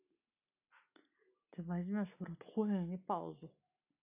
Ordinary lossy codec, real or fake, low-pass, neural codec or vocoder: none; real; 3.6 kHz; none